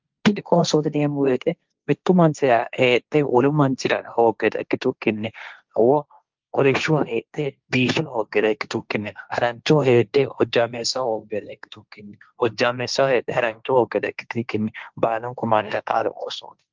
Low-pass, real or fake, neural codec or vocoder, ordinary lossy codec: 7.2 kHz; fake; codec, 16 kHz, 1.1 kbps, Voila-Tokenizer; Opus, 24 kbps